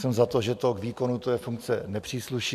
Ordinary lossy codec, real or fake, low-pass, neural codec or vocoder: MP3, 96 kbps; real; 14.4 kHz; none